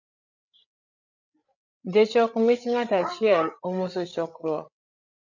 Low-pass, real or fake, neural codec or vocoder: 7.2 kHz; fake; codec, 16 kHz, 8 kbps, FreqCodec, larger model